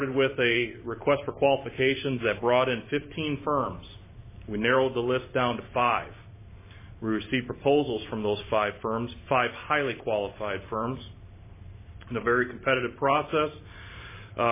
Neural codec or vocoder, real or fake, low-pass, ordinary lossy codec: vocoder, 44.1 kHz, 128 mel bands every 512 samples, BigVGAN v2; fake; 3.6 kHz; MP3, 16 kbps